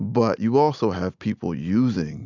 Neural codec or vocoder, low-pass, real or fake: none; 7.2 kHz; real